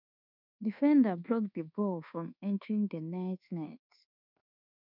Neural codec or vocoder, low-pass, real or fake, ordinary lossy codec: codec, 24 kHz, 1.2 kbps, DualCodec; 5.4 kHz; fake; none